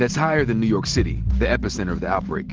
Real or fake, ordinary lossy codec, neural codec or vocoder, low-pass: real; Opus, 16 kbps; none; 7.2 kHz